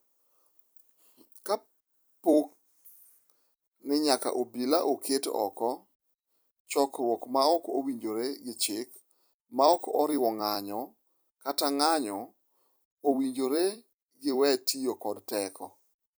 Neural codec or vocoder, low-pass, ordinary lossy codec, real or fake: vocoder, 44.1 kHz, 128 mel bands every 256 samples, BigVGAN v2; none; none; fake